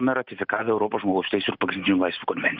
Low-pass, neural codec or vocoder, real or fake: 5.4 kHz; none; real